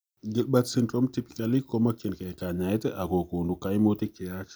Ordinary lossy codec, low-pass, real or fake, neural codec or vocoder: none; none; real; none